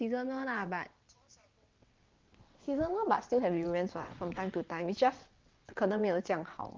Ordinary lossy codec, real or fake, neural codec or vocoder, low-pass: Opus, 32 kbps; fake; vocoder, 22.05 kHz, 80 mel bands, WaveNeXt; 7.2 kHz